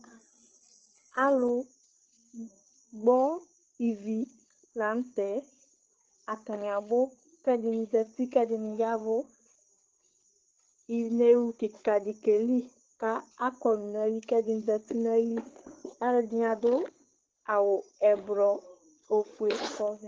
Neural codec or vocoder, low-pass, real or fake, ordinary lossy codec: codec, 16 kHz, 8 kbps, FreqCodec, larger model; 7.2 kHz; fake; Opus, 16 kbps